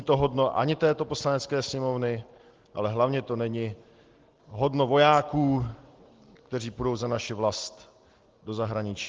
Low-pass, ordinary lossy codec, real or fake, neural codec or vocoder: 7.2 kHz; Opus, 16 kbps; real; none